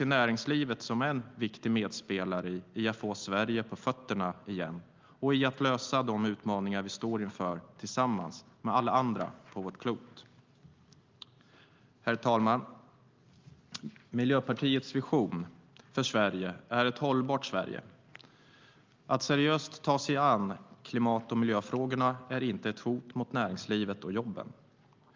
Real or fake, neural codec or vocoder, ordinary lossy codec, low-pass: real; none; Opus, 24 kbps; 7.2 kHz